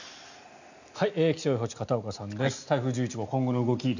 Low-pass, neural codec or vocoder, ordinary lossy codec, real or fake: 7.2 kHz; none; none; real